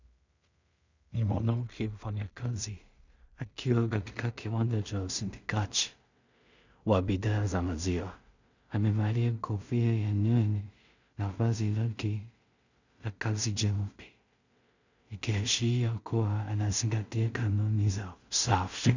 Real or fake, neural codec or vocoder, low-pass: fake; codec, 16 kHz in and 24 kHz out, 0.4 kbps, LongCat-Audio-Codec, two codebook decoder; 7.2 kHz